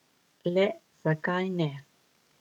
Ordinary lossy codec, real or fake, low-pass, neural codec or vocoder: none; fake; 19.8 kHz; codec, 44.1 kHz, 7.8 kbps, DAC